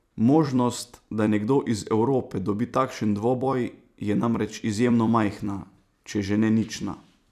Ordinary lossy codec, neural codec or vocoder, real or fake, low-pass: none; vocoder, 44.1 kHz, 128 mel bands every 256 samples, BigVGAN v2; fake; 14.4 kHz